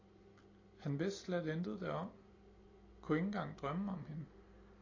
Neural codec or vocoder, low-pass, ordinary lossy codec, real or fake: none; 7.2 kHz; AAC, 32 kbps; real